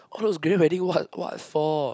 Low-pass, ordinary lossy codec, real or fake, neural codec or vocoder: none; none; real; none